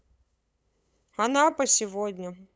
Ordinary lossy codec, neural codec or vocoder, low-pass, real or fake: none; codec, 16 kHz, 8 kbps, FunCodec, trained on LibriTTS, 25 frames a second; none; fake